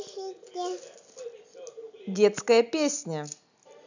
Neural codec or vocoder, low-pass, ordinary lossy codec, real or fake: none; 7.2 kHz; none; real